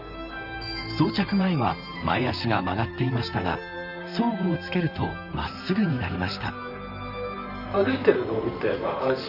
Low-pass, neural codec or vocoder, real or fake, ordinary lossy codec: 5.4 kHz; vocoder, 44.1 kHz, 128 mel bands, Pupu-Vocoder; fake; none